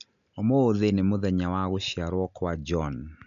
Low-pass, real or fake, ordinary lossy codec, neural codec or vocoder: 7.2 kHz; real; none; none